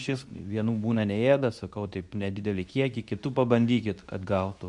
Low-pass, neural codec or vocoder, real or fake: 10.8 kHz; codec, 24 kHz, 0.9 kbps, WavTokenizer, medium speech release version 2; fake